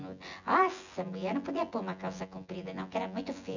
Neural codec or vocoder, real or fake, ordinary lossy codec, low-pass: vocoder, 24 kHz, 100 mel bands, Vocos; fake; none; 7.2 kHz